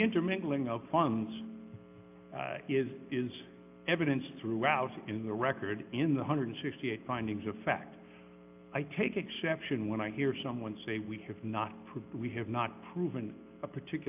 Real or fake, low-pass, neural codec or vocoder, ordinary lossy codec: real; 3.6 kHz; none; AAC, 32 kbps